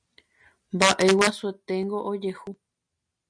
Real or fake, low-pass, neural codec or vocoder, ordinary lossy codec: real; 9.9 kHz; none; MP3, 64 kbps